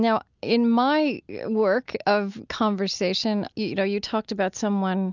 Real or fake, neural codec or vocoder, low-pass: real; none; 7.2 kHz